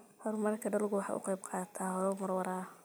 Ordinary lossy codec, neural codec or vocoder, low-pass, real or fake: none; none; none; real